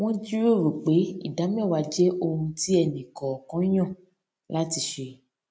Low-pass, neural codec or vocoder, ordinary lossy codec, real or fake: none; none; none; real